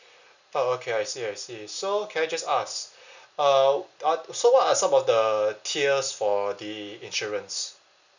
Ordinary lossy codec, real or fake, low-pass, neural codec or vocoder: none; real; 7.2 kHz; none